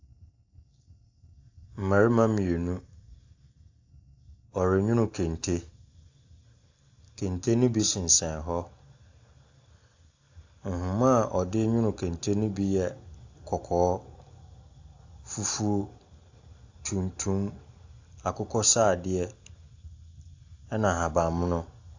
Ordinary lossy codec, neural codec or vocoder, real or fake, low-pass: AAC, 48 kbps; none; real; 7.2 kHz